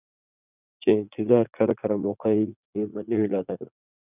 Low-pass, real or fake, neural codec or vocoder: 3.6 kHz; fake; vocoder, 44.1 kHz, 80 mel bands, Vocos